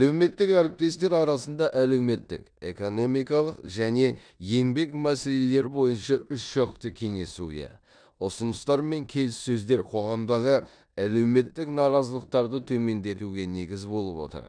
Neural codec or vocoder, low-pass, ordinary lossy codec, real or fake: codec, 16 kHz in and 24 kHz out, 0.9 kbps, LongCat-Audio-Codec, four codebook decoder; 9.9 kHz; none; fake